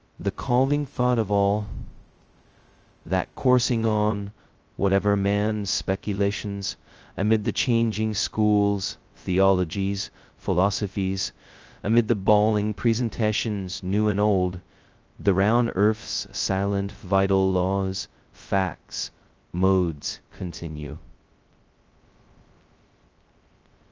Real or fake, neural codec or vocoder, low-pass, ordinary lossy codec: fake; codec, 16 kHz, 0.2 kbps, FocalCodec; 7.2 kHz; Opus, 24 kbps